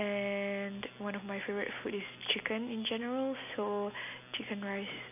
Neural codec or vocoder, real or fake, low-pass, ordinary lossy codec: none; real; 3.6 kHz; none